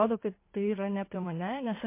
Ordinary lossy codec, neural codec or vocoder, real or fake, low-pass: MP3, 24 kbps; codec, 16 kHz in and 24 kHz out, 2.2 kbps, FireRedTTS-2 codec; fake; 3.6 kHz